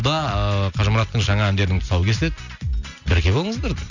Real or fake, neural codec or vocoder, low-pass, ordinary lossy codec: real; none; 7.2 kHz; none